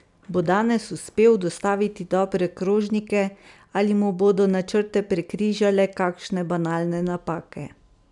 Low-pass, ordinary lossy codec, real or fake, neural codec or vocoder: 10.8 kHz; none; real; none